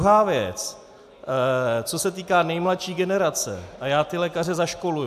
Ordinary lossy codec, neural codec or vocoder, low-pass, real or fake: AAC, 96 kbps; none; 14.4 kHz; real